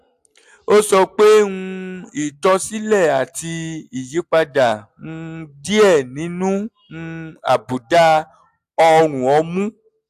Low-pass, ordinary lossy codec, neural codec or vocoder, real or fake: 14.4 kHz; AAC, 96 kbps; none; real